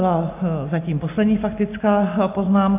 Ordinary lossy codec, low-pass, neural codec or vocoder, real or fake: AAC, 24 kbps; 3.6 kHz; none; real